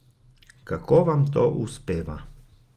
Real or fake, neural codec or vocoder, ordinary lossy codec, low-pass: real; none; Opus, 24 kbps; 14.4 kHz